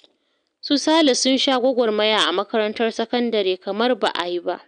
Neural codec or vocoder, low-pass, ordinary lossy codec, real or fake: none; 9.9 kHz; none; real